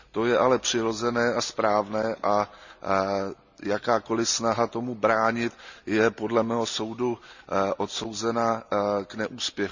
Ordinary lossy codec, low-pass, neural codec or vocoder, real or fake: none; 7.2 kHz; none; real